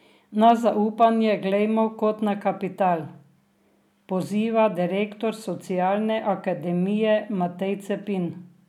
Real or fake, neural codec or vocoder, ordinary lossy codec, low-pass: real; none; none; 19.8 kHz